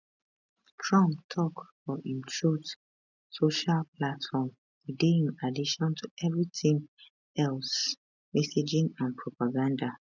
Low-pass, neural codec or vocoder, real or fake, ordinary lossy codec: 7.2 kHz; none; real; none